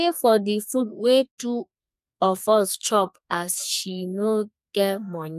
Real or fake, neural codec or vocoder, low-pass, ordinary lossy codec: fake; codec, 32 kHz, 1.9 kbps, SNAC; 14.4 kHz; none